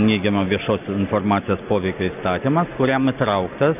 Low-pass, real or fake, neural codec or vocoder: 3.6 kHz; real; none